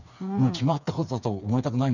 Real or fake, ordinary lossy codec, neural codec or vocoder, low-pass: fake; none; codec, 16 kHz, 4 kbps, FreqCodec, smaller model; 7.2 kHz